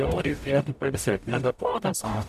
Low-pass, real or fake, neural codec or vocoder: 14.4 kHz; fake; codec, 44.1 kHz, 0.9 kbps, DAC